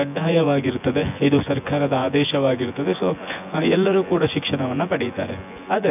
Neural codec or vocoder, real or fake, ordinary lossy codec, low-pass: vocoder, 24 kHz, 100 mel bands, Vocos; fake; none; 3.6 kHz